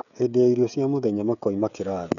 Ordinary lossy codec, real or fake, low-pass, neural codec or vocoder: none; fake; 7.2 kHz; codec, 16 kHz, 16 kbps, FreqCodec, smaller model